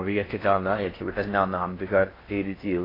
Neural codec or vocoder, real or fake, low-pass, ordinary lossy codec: codec, 16 kHz in and 24 kHz out, 0.6 kbps, FocalCodec, streaming, 4096 codes; fake; 5.4 kHz; AAC, 24 kbps